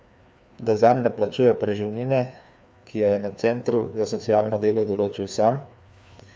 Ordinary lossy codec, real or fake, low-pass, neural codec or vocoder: none; fake; none; codec, 16 kHz, 2 kbps, FreqCodec, larger model